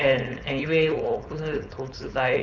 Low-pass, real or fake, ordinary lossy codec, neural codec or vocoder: 7.2 kHz; fake; none; codec, 16 kHz, 4.8 kbps, FACodec